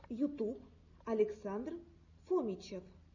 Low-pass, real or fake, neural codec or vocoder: 7.2 kHz; real; none